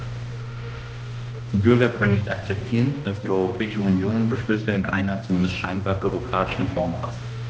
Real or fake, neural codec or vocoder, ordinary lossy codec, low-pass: fake; codec, 16 kHz, 1 kbps, X-Codec, HuBERT features, trained on balanced general audio; none; none